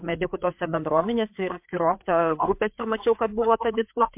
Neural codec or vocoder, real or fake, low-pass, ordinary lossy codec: codec, 16 kHz, 4 kbps, FreqCodec, larger model; fake; 3.6 kHz; MP3, 32 kbps